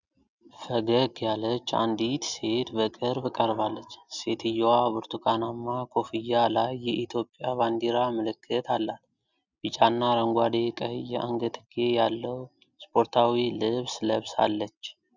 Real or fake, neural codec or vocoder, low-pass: real; none; 7.2 kHz